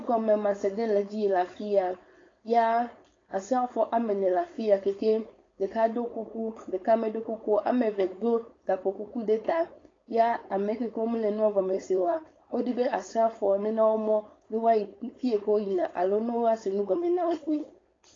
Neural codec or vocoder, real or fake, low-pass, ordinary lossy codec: codec, 16 kHz, 4.8 kbps, FACodec; fake; 7.2 kHz; AAC, 32 kbps